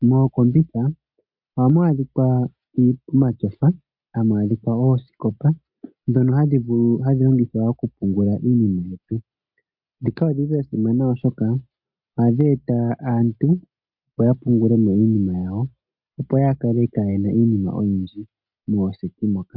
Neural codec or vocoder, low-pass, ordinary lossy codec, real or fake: none; 5.4 kHz; MP3, 48 kbps; real